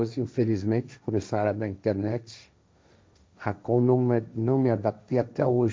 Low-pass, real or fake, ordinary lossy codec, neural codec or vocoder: none; fake; none; codec, 16 kHz, 1.1 kbps, Voila-Tokenizer